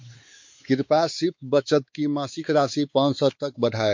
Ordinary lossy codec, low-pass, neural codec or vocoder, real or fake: MP3, 48 kbps; 7.2 kHz; codec, 16 kHz, 4 kbps, X-Codec, WavLM features, trained on Multilingual LibriSpeech; fake